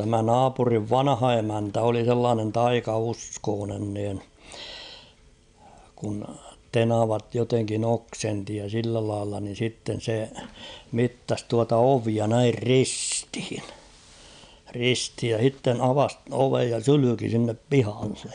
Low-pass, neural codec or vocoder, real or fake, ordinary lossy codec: 9.9 kHz; none; real; none